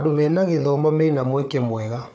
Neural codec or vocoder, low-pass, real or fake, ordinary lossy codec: codec, 16 kHz, 4 kbps, FunCodec, trained on Chinese and English, 50 frames a second; none; fake; none